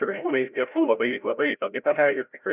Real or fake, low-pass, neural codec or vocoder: fake; 3.6 kHz; codec, 16 kHz, 0.5 kbps, FreqCodec, larger model